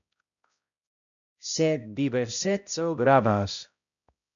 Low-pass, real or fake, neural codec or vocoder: 7.2 kHz; fake; codec, 16 kHz, 0.5 kbps, X-Codec, HuBERT features, trained on balanced general audio